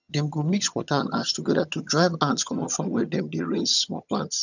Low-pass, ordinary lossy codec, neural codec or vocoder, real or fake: 7.2 kHz; none; vocoder, 22.05 kHz, 80 mel bands, HiFi-GAN; fake